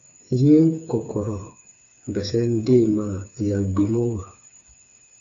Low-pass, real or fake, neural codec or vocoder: 7.2 kHz; fake; codec, 16 kHz, 4 kbps, FreqCodec, smaller model